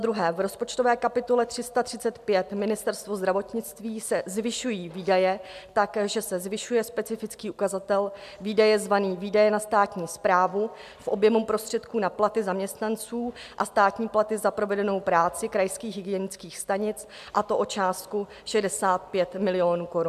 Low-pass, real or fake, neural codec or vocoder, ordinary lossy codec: 14.4 kHz; real; none; MP3, 96 kbps